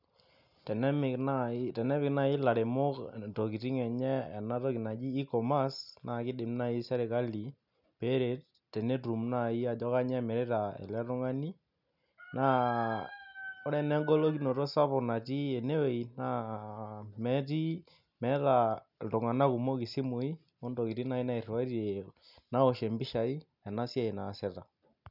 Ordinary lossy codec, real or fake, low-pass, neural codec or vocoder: none; real; 5.4 kHz; none